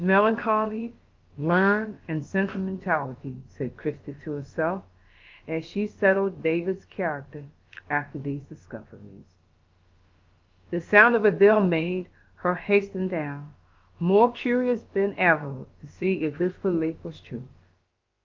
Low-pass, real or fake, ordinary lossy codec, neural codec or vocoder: 7.2 kHz; fake; Opus, 32 kbps; codec, 16 kHz, about 1 kbps, DyCAST, with the encoder's durations